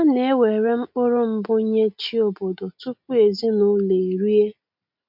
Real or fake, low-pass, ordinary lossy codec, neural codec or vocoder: real; 5.4 kHz; MP3, 48 kbps; none